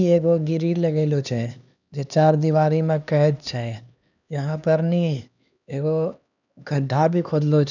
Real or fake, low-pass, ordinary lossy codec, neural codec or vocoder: fake; 7.2 kHz; none; codec, 16 kHz, 2 kbps, X-Codec, HuBERT features, trained on LibriSpeech